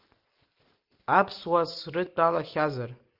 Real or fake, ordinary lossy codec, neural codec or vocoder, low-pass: real; Opus, 32 kbps; none; 5.4 kHz